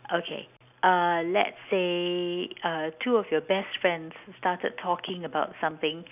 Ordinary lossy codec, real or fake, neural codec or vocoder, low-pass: none; real; none; 3.6 kHz